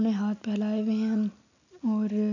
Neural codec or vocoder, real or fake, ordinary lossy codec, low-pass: none; real; none; 7.2 kHz